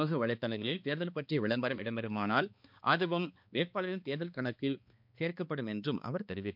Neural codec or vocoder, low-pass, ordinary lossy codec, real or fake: codec, 16 kHz, 2 kbps, X-Codec, HuBERT features, trained on balanced general audio; 5.4 kHz; MP3, 48 kbps; fake